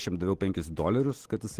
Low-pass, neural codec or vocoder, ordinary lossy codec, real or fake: 14.4 kHz; none; Opus, 16 kbps; real